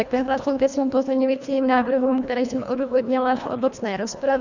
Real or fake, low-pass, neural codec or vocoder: fake; 7.2 kHz; codec, 24 kHz, 1.5 kbps, HILCodec